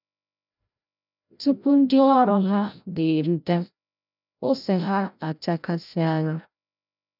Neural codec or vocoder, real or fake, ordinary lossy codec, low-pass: codec, 16 kHz, 0.5 kbps, FreqCodec, larger model; fake; none; 5.4 kHz